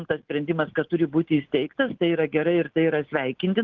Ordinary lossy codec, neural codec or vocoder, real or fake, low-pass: Opus, 16 kbps; none; real; 7.2 kHz